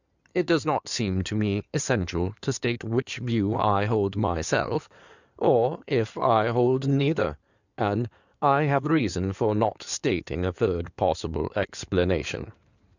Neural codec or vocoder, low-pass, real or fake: codec, 16 kHz in and 24 kHz out, 2.2 kbps, FireRedTTS-2 codec; 7.2 kHz; fake